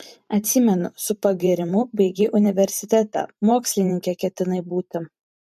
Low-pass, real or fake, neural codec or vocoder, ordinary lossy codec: 14.4 kHz; fake; vocoder, 44.1 kHz, 128 mel bands every 512 samples, BigVGAN v2; MP3, 64 kbps